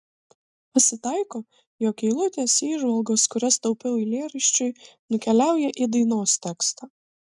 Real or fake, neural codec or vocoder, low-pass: real; none; 10.8 kHz